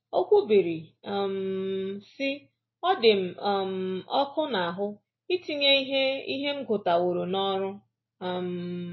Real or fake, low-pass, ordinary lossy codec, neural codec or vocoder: real; 7.2 kHz; MP3, 24 kbps; none